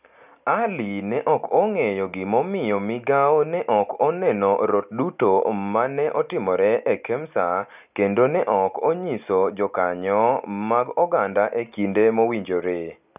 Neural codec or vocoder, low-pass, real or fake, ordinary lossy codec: none; 3.6 kHz; real; none